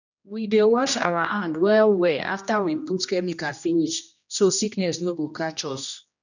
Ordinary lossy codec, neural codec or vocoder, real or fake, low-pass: none; codec, 16 kHz, 1 kbps, X-Codec, HuBERT features, trained on general audio; fake; 7.2 kHz